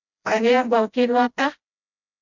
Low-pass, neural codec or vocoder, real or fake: 7.2 kHz; codec, 16 kHz, 0.5 kbps, FreqCodec, smaller model; fake